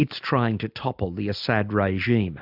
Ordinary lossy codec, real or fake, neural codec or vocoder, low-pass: AAC, 48 kbps; real; none; 5.4 kHz